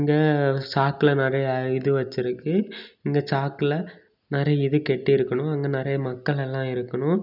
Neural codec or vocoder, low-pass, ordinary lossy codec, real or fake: none; 5.4 kHz; none; real